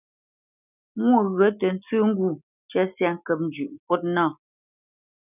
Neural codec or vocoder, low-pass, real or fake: none; 3.6 kHz; real